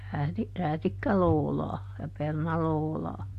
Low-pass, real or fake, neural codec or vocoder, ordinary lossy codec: 14.4 kHz; real; none; AAC, 64 kbps